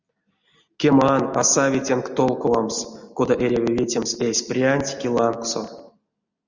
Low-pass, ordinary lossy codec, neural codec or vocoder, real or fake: 7.2 kHz; Opus, 64 kbps; none; real